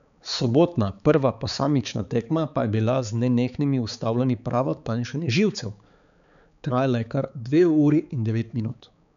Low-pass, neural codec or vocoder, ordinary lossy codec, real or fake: 7.2 kHz; codec, 16 kHz, 4 kbps, X-Codec, HuBERT features, trained on balanced general audio; none; fake